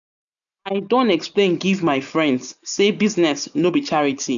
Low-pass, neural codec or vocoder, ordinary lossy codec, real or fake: 7.2 kHz; none; none; real